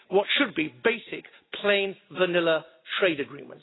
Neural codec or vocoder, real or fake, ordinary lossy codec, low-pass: none; real; AAC, 16 kbps; 7.2 kHz